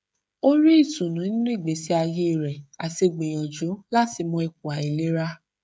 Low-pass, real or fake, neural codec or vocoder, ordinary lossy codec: none; fake; codec, 16 kHz, 16 kbps, FreqCodec, smaller model; none